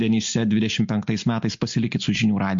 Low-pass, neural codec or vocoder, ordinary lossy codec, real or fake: 7.2 kHz; none; MP3, 48 kbps; real